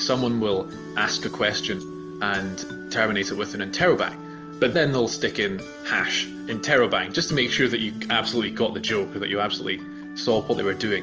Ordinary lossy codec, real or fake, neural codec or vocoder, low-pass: Opus, 24 kbps; real; none; 7.2 kHz